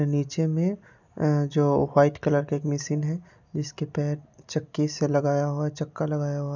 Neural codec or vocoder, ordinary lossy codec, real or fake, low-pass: none; none; real; 7.2 kHz